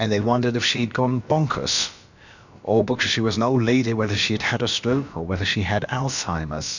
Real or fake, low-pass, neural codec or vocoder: fake; 7.2 kHz; codec, 16 kHz, about 1 kbps, DyCAST, with the encoder's durations